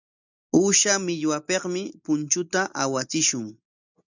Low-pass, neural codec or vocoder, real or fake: 7.2 kHz; none; real